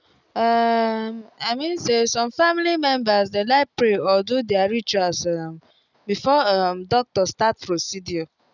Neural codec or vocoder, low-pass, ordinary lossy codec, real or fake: none; 7.2 kHz; none; real